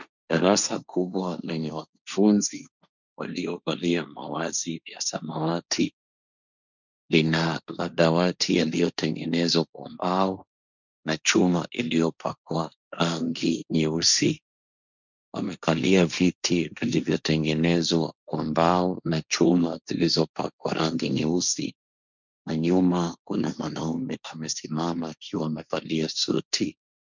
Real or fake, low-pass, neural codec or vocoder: fake; 7.2 kHz; codec, 16 kHz, 1.1 kbps, Voila-Tokenizer